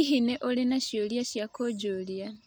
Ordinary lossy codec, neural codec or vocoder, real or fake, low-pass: none; none; real; none